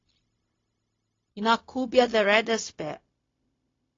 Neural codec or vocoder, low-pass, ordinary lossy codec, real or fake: codec, 16 kHz, 0.4 kbps, LongCat-Audio-Codec; 7.2 kHz; AAC, 32 kbps; fake